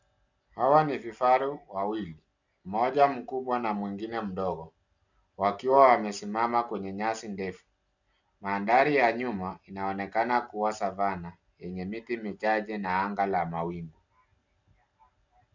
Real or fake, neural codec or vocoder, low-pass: real; none; 7.2 kHz